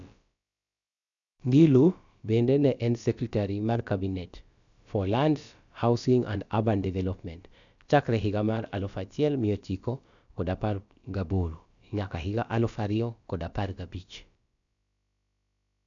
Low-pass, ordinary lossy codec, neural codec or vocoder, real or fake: 7.2 kHz; none; codec, 16 kHz, about 1 kbps, DyCAST, with the encoder's durations; fake